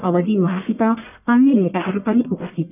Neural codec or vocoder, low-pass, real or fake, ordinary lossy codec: codec, 44.1 kHz, 1.7 kbps, Pupu-Codec; 3.6 kHz; fake; none